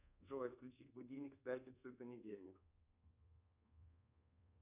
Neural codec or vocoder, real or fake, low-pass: codec, 24 kHz, 1.2 kbps, DualCodec; fake; 3.6 kHz